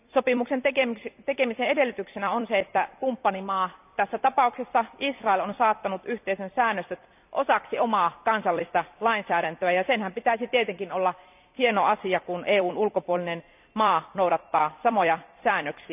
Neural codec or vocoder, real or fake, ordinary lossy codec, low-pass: vocoder, 44.1 kHz, 128 mel bands every 256 samples, BigVGAN v2; fake; none; 3.6 kHz